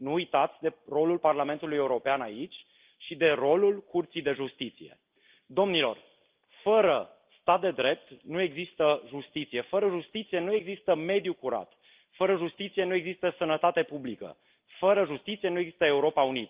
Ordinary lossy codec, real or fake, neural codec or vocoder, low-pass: Opus, 32 kbps; real; none; 3.6 kHz